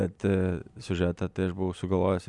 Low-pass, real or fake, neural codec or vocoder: 10.8 kHz; real; none